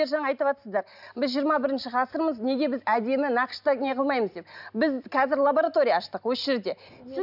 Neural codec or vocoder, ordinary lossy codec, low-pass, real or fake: none; none; 5.4 kHz; real